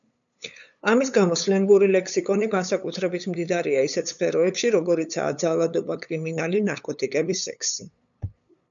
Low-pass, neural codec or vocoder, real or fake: 7.2 kHz; codec, 16 kHz, 8 kbps, FunCodec, trained on LibriTTS, 25 frames a second; fake